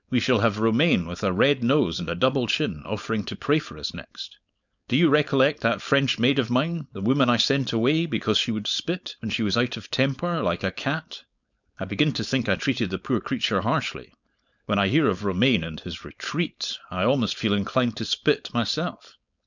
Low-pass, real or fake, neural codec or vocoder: 7.2 kHz; fake; codec, 16 kHz, 4.8 kbps, FACodec